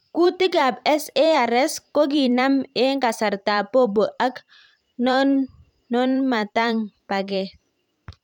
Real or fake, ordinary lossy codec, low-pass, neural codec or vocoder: fake; none; 19.8 kHz; vocoder, 44.1 kHz, 128 mel bands every 512 samples, BigVGAN v2